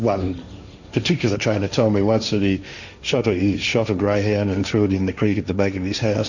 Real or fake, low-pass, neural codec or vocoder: fake; 7.2 kHz; codec, 16 kHz, 1.1 kbps, Voila-Tokenizer